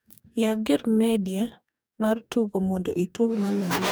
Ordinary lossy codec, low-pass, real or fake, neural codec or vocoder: none; none; fake; codec, 44.1 kHz, 2.6 kbps, DAC